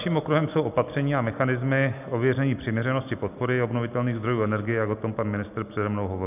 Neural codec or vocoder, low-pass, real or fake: none; 3.6 kHz; real